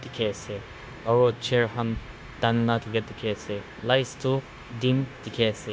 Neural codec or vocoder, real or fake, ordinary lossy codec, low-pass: codec, 16 kHz, 0.9 kbps, LongCat-Audio-Codec; fake; none; none